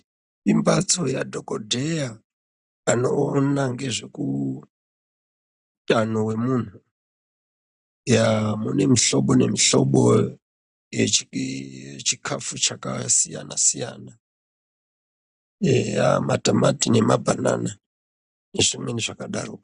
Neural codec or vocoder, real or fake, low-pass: none; real; 9.9 kHz